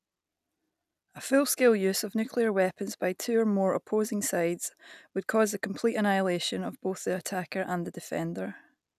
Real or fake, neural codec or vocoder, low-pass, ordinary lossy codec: real; none; 14.4 kHz; none